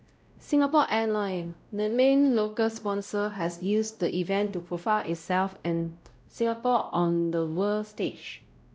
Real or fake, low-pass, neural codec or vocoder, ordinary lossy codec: fake; none; codec, 16 kHz, 0.5 kbps, X-Codec, WavLM features, trained on Multilingual LibriSpeech; none